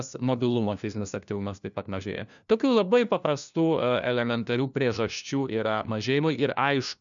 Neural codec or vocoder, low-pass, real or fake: codec, 16 kHz, 1 kbps, FunCodec, trained on LibriTTS, 50 frames a second; 7.2 kHz; fake